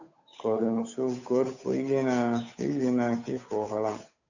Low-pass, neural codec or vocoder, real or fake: 7.2 kHz; codec, 16 kHz, 8 kbps, FunCodec, trained on Chinese and English, 25 frames a second; fake